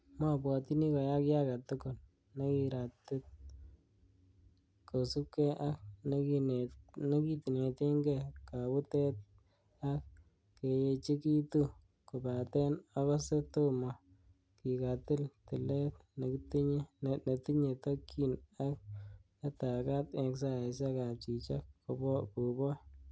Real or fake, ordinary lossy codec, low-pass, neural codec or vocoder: real; none; none; none